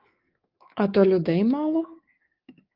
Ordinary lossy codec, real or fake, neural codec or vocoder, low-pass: Opus, 32 kbps; fake; codec, 24 kHz, 3.1 kbps, DualCodec; 5.4 kHz